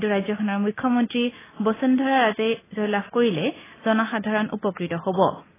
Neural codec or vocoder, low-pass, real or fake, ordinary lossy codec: none; 3.6 kHz; real; AAC, 16 kbps